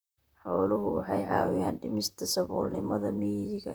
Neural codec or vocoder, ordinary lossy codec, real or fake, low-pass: vocoder, 44.1 kHz, 128 mel bands, Pupu-Vocoder; none; fake; none